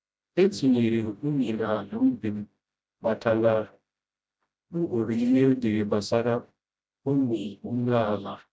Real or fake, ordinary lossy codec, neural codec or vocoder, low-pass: fake; none; codec, 16 kHz, 0.5 kbps, FreqCodec, smaller model; none